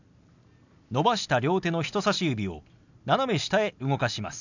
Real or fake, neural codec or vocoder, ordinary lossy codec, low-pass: real; none; none; 7.2 kHz